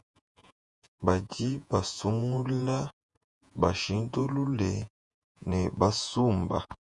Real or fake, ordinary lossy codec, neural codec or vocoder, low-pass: fake; MP3, 96 kbps; vocoder, 48 kHz, 128 mel bands, Vocos; 10.8 kHz